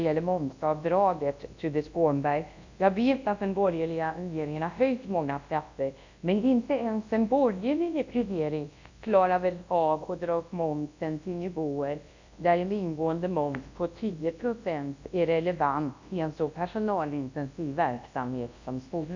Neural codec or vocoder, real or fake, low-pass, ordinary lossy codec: codec, 24 kHz, 0.9 kbps, WavTokenizer, large speech release; fake; 7.2 kHz; AAC, 48 kbps